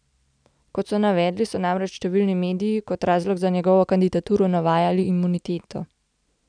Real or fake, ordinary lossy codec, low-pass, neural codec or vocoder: real; none; 9.9 kHz; none